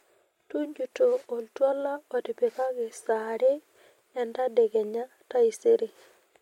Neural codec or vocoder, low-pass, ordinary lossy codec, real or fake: none; 19.8 kHz; MP3, 64 kbps; real